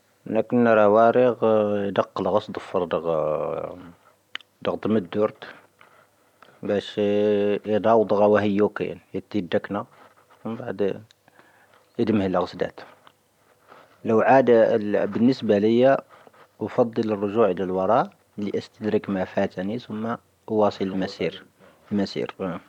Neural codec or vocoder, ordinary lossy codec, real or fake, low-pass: vocoder, 44.1 kHz, 128 mel bands every 256 samples, BigVGAN v2; none; fake; 19.8 kHz